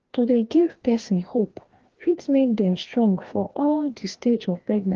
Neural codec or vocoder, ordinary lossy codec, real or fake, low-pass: codec, 16 kHz, 1 kbps, FreqCodec, larger model; Opus, 16 kbps; fake; 7.2 kHz